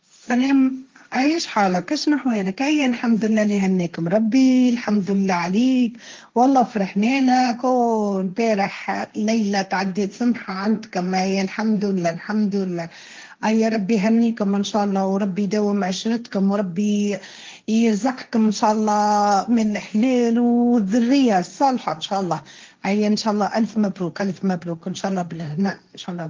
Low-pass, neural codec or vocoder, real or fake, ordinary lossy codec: 7.2 kHz; codec, 16 kHz, 1.1 kbps, Voila-Tokenizer; fake; Opus, 32 kbps